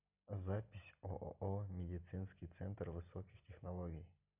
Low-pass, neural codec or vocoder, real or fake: 3.6 kHz; none; real